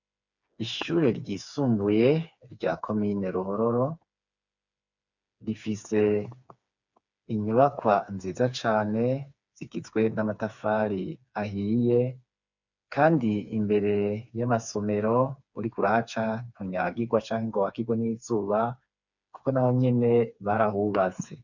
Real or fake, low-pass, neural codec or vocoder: fake; 7.2 kHz; codec, 16 kHz, 4 kbps, FreqCodec, smaller model